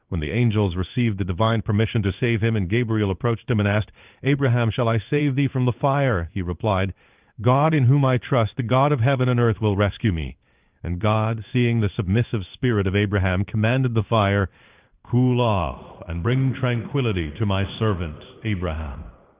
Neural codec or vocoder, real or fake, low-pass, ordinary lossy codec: codec, 16 kHz in and 24 kHz out, 1 kbps, XY-Tokenizer; fake; 3.6 kHz; Opus, 32 kbps